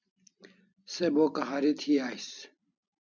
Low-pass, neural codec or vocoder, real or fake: 7.2 kHz; vocoder, 44.1 kHz, 128 mel bands every 256 samples, BigVGAN v2; fake